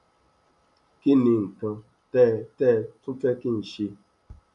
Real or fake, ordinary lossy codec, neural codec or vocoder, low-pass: real; none; none; 10.8 kHz